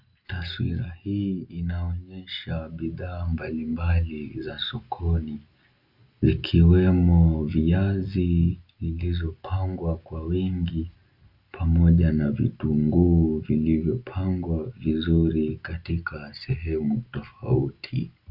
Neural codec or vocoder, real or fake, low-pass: none; real; 5.4 kHz